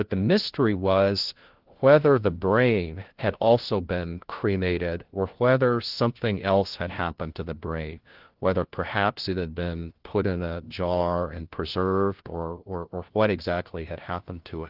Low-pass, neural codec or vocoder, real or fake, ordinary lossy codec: 5.4 kHz; codec, 16 kHz, 1 kbps, FunCodec, trained on LibriTTS, 50 frames a second; fake; Opus, 16 kbps